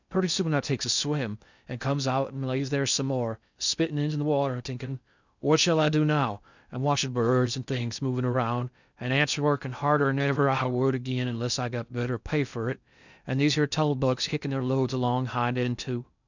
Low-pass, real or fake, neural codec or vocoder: 7.2 kHz; fake; codec, 16 kHz in and 24 kHz out, 0.6 kbps, FocalCodec, streaming, 2048 codes